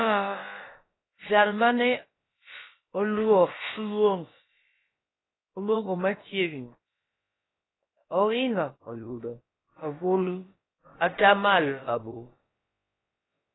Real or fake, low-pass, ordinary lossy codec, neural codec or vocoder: fake; 7.2 kHz; AAC, 16 kbps; codec, 16 kHz, about 1 kbps, DyCAST, with the encoder's durations